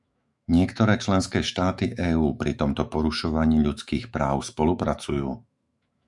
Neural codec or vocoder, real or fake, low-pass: autoencoder, 48 kHz, 128 numbers a frame, DAC-VAE, trained on Japanese speech; fake; 10.8 kHz